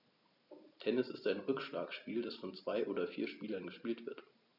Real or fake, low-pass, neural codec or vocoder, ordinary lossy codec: real; 5.4 kHz; none; none